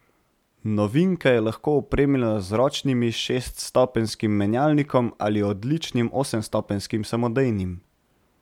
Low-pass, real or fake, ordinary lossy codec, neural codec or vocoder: 19.8 kHz; real; MP3, 96 kbps; none